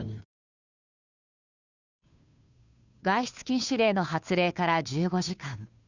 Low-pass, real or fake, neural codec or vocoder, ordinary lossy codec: 7.2 kHz; fake; codec, 16 kHz, 2 kbps, FunCodec, trained on Chinese and English, 25 frames a second; none